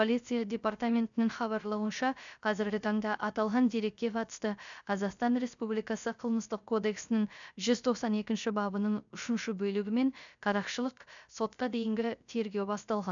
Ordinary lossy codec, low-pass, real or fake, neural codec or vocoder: none; 7.2 kHz; fake; codec, 16 kHz, 0.3 kbps, FocalCodec